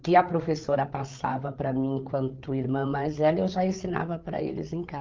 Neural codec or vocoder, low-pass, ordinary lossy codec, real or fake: codec, 16 kHz, 8 kbps, FreqCodec, larger model; 7.2 kHz; Opus, 24 kbps; fake